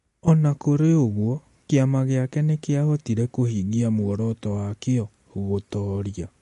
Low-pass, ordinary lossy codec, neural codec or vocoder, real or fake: 14.4 kHz; MP3, 48 kbps; none; real